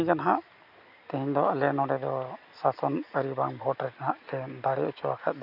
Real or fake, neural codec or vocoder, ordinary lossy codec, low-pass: real; none; none; 5.4 kHz